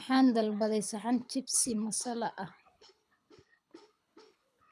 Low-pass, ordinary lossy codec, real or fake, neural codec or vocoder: none; none; fake; codec, 24 kHz, 6 kbps, HILCodec